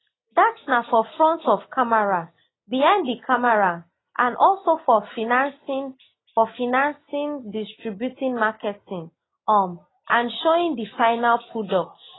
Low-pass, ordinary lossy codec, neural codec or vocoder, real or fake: 7.2 kHz; AAC, 16 kbps; none; real